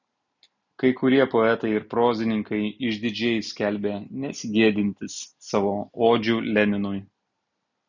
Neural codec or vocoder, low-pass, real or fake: none; 7.2 kHz; real